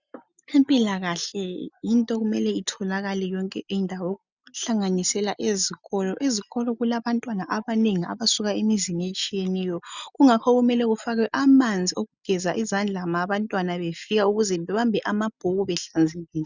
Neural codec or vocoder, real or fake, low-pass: none; real; 7.2 kHz